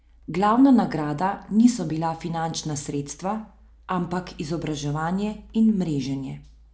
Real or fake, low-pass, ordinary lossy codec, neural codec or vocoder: real; none; none; none